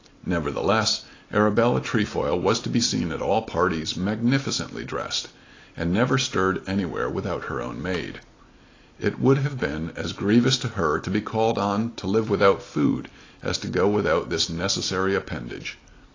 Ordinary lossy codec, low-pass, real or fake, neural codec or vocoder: AAC, 32 kbps; 7.2 kHz; real; none